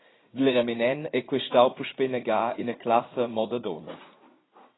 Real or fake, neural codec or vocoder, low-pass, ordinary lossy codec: fake; vocoder, 44.1 kHz, 80 mel bands, Vocos; 7.2 kHz; AAC, 16 kbps